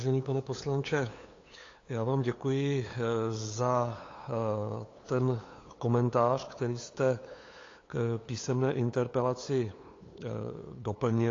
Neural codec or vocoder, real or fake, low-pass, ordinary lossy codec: codec, 16 kHz, 8 kbps, FunCodec, trained on LibriTTS, 25 frames a second; fake; 7.2 kHz; AAC, 32 kbps